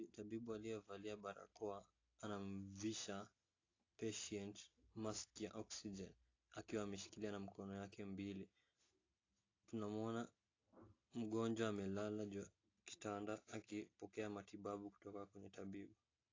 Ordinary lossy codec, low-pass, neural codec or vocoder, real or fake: AAC, 32 kbps; 7.2 kHz; none; real